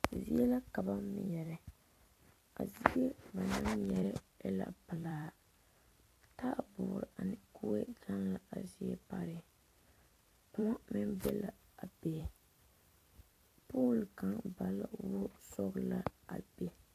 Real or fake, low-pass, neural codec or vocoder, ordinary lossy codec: fake; 14.4 kHz; vocoder, 44.1 kHz, 128 mel bands every 256 samples, BigVGAN v2; AAC, 64 kbps